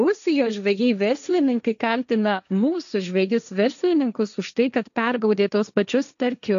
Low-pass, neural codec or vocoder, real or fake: 7.2 kHz; codec, 16 kHz, 1.1 kbps, Voila-Tokenizer; fake